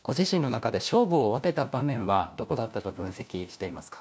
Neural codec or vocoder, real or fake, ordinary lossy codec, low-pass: codec, 16 kHz, 1 kbps, FunCodec, trained on LibriTTS, 50 frames a second; fake; none; none